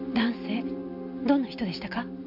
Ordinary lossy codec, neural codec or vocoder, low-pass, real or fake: none; none; 5.4 kHz; real